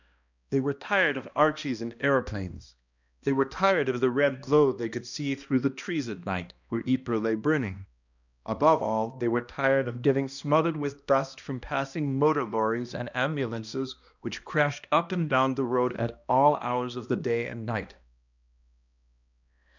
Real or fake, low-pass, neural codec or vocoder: fake; 7.2 kHz; codec, 16 kHz, 1 kbps, X-Codec, HuBERT features, trained on balanced general audio